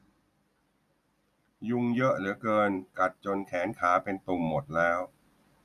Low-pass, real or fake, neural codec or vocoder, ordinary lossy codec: 14.4 kHz; real; none; none